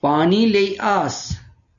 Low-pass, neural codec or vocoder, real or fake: 7.2 kHz; none; real